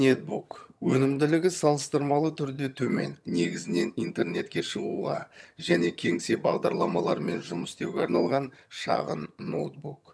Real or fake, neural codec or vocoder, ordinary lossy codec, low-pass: fake; vocoder, 22.05 kHz, 80 mel bands, HiFi-GAN; none; none